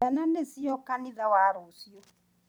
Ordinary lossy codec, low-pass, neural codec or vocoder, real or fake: none; none; vocoder, 44.1 kHz, 128 mel bands every 256 samples, BigVGAN v2; fake